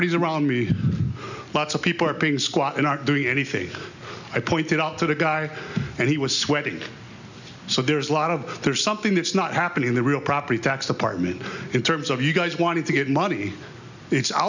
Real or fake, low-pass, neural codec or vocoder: real; 7.2 kHz; none